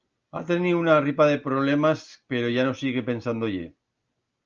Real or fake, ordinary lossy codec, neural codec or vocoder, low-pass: real; Opus, 24 kbps; none; 7.2 kHz